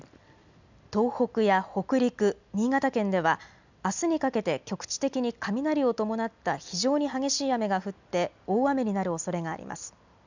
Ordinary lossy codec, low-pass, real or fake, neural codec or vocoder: none; 7.2 kHz; real; none